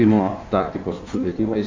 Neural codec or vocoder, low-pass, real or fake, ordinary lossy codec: codec, 16 kHz in and 24 kHz out, 1.1 kbps, FireRedTTS-2 codec; 7.2 kHz; fake; MP3, 48 kbps